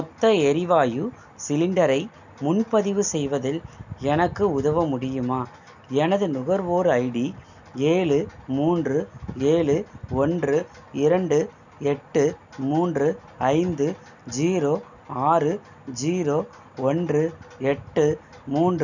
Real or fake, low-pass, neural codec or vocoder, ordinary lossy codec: real; 7.2 kHz; none; none